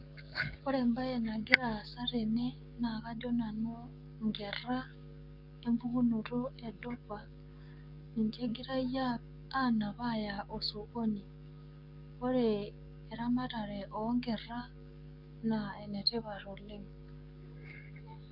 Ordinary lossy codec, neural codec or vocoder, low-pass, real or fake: none; codec, 44.1 kHz, 7.8 kbps, DAC; 5.4 kHz; fake